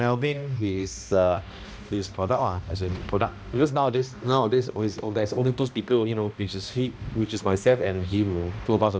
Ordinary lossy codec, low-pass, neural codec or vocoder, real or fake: none; none; codec, 16 kHz, 1 kbps, X-Codec, HuBERT features, trained on balanced general audio; fake